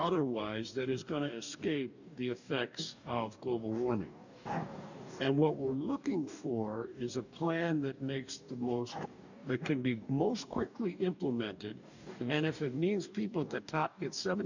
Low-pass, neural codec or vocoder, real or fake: 7.2 kHz; codec, 44.1 kHz, 2.6 kbps, DAC; fake